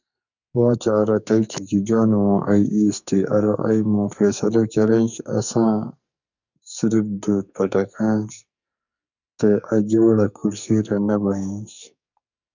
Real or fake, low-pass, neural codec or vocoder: fake; 7.2 kHz; codec, 44.1 kHz, 2.6 kbps, SNAC